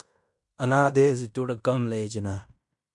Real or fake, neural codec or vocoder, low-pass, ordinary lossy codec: fake; codec, 16 kHz in and 24 kHz out, 0.9 kbps, LongCat-Audio-Codec, fine tuned four codebook decoder; 10.8 kHz; MP3, 64 kbps